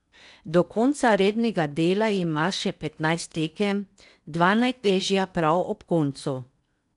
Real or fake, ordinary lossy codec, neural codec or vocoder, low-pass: fake; none; codec, 16 kHz in and 24 kHz out, 0.8 kbps, FocalCodec, streaming, 65536 codes; 10.8 kHz